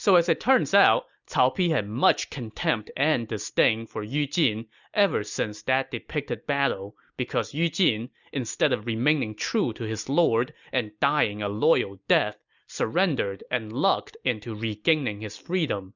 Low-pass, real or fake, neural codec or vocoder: 7.2 kHz; real; none